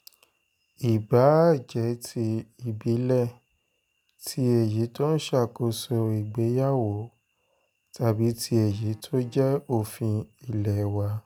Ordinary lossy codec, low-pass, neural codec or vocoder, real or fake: none; none; none; real